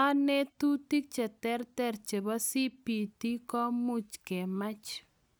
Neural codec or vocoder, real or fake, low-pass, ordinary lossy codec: none; real; none; none